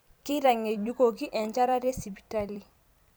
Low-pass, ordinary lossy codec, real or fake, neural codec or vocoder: none; none; fake; vocoder, 44.1 kHz, 128 mel bands every 256 samples, BigVGAN v2